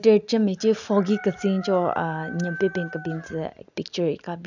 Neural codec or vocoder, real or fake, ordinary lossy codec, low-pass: none; real; none; 7.2 kHz